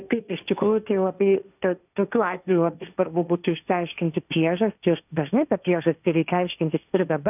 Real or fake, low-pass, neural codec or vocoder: fake; 3.6 kHz; codec, 16 kHz, 1.1 kbps, Voila-Tokenizer